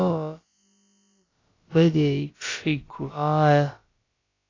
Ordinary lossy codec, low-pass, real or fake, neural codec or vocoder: AAC, 32 kbps; 7.2 kHz; fake; codec, 16 kHz, about 1 kbps, DyCAST, with the encoder's durations